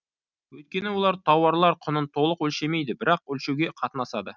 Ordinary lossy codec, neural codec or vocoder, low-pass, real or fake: none; none; 7.2 kHz; real